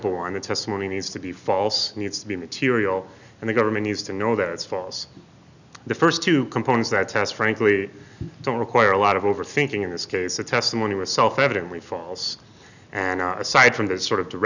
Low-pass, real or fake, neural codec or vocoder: 7.2 kHz; real; none